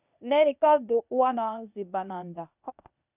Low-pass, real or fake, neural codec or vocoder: 3.6 kHz; fake; codec, 16 kHz, 0.8 kbps, ZipCodec